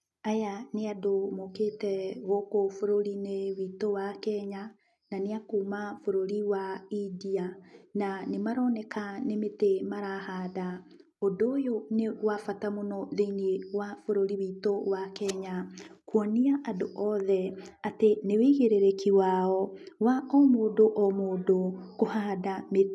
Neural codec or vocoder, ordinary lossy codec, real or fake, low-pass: none; none; real; none